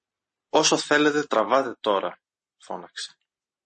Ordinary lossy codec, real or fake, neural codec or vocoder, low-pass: MP3, 32 kbps; real; none; 10.8 kHz